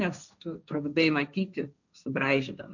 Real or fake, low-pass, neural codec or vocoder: fake; 7.2 kHz; codec, 16 kHz, 1.1 kbps, Voila-Tokenizer